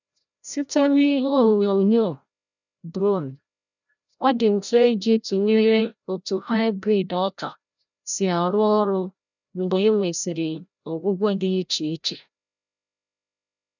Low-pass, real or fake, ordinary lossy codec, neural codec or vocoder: 7.2 kHz; fake; none; codec, 16 kHz, 0.5 kbps, FreqCodec, larger model